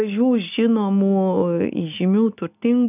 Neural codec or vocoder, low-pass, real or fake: none; 3.6 kHz; real